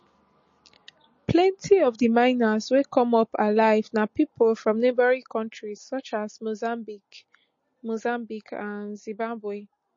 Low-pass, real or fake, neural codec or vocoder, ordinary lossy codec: 7.2 kHz; real; none; MP3, 32 kbps